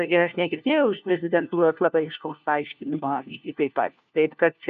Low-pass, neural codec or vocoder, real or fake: 7.2 kHz; codec, 16 kHz, 1 kbps, FunCodec, trained on LibriTTS, 50 frames a second; fake